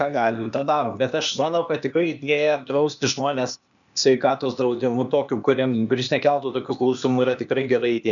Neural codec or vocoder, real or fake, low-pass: codec, 16 kHz, 0.8 kbps, ZipCodec; fake; 7.2 kHz